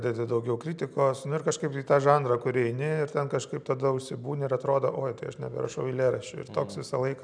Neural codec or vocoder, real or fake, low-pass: none; real; 9.9 kHz